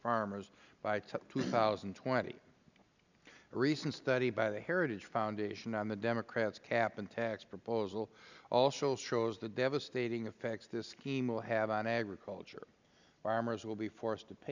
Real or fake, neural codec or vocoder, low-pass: real; none; 7.2 kHz